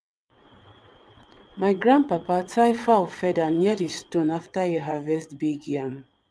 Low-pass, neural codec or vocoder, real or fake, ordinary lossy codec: none; vocoder, 22.05 kHz, 80 mel bands, WaveNeXt; fake; none